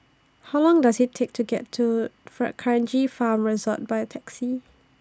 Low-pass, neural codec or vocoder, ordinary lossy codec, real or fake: none; none; none; real